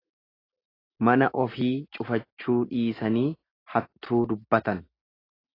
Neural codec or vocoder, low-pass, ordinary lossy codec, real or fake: none; 5.4 kHz; AAC, 24 kbps; real